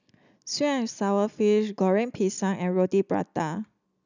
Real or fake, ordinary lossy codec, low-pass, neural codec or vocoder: real; none; 7.2 kHz; none